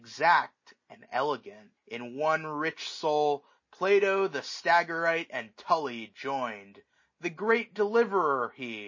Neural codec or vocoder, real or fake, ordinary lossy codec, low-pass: none; real; MP3, 32 kbps; 7.2 kHz